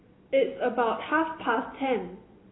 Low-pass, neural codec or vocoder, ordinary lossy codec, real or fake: 7.2 kHz; none; AAC, 16 kbps; real